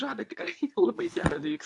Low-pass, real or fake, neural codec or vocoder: 10.8 kHz; fake; codec, 24 kHz, 0.9 kbps, WavTokenizer, medium speech release version 2